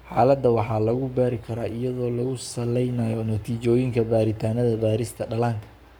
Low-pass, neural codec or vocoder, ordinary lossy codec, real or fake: none; codec, 44.1 kHz, 7.8 kbps, Pupu-Codec; none; fake